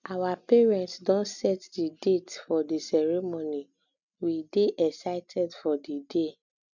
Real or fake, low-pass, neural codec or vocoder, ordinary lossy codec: real; 7.2 kHz; none; none